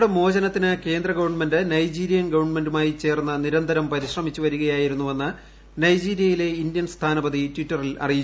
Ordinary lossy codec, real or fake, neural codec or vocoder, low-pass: none; real; none; none